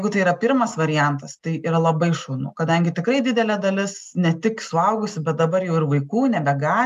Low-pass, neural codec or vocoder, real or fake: 14.4 kHz; none; real